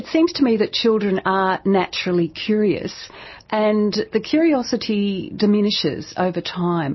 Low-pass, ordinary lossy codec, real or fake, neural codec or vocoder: 7.2 kHz; MP3, 24 kbps; real; none